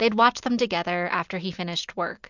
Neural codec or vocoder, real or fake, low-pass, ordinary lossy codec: none; real; 7.2 kHz; MP3, 64 kbps